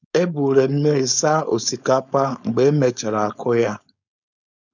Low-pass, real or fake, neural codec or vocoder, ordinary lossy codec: 7.2 kHz; fake; codec, 16 kHz, 4.8 kbps, FACodec; none